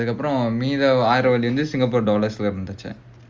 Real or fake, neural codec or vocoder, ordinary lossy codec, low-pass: real; none; Opus, 32 kbps; 7.2 kHz